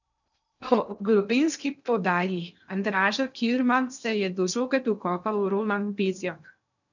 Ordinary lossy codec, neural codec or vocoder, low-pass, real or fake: none; codec, 16 kHz in and 24 kHz out, 0.6 kbps, FocalCodec, streaming, 2048 codes; 7.2 kHz; fake